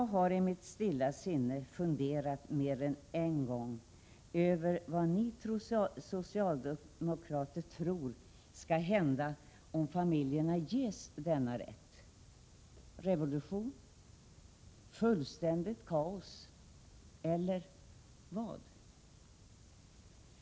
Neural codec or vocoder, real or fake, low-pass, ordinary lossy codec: none; real; none; none